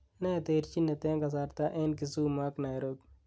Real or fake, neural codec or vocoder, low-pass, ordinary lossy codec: real; none; none; none